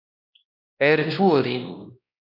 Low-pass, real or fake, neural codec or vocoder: 5.4 kHz; fake; codec, 16 kHz, 1 kbps, X-Codec, WavLM features, trained on Multilingual LibriSpeech